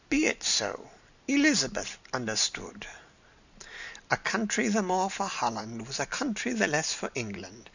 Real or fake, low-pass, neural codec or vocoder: real; 7.2 kHz; none